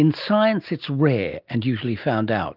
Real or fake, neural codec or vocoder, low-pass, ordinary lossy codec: real; none; 5.4 kHz; Opus, 24 kbps